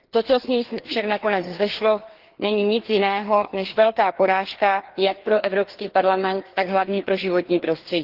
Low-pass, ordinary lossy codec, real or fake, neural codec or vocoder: 5.4 kHz; Opus, 16 kbps; fake; codec, 16 kHz, 2 kbps, FreqCodec, larger model